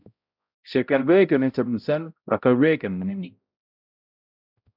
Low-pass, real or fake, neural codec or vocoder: 5.4 kHz; fake; codec, 16 kHz, 0.5 kbps, X-Codec, HuBERT features, trained on balanced general audio